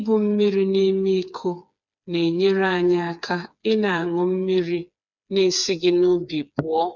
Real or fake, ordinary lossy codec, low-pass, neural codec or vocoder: fake; none; 7.2 kHz; codec, 16 kHz, 4 kbps, FreqCodec, smaller model